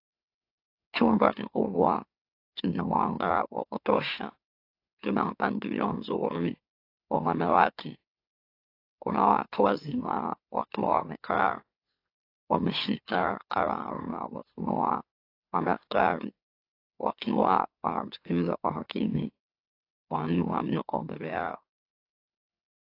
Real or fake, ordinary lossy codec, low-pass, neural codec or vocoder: fake; AAC, 32 kbps; 5.4 kHz; autoencoder, 44.1 kHz, a latent of 192 numbers a frame, MeloTTS